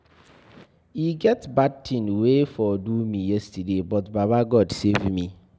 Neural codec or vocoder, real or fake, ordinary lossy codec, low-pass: none; real; none; none